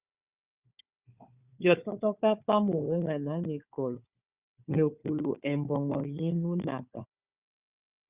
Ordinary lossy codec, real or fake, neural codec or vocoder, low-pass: Opus, 64 kbps; fake; codec, 16 kHz, 4 kbps, FunCodec, trained on Chinese and English, 50 frames a second; 3.6 kHz